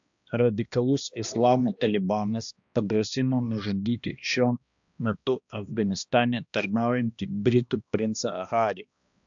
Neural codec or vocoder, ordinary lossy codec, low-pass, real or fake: codec, 16 kHz, 1 kbps, X-Codec, HuBERT features, trained on balanced general audio; AAC, 64 kbps; 7.2 kHz; fake